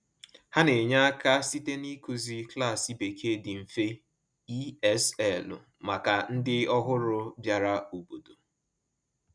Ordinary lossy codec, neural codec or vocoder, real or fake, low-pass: none; none; real; 9.9 kHz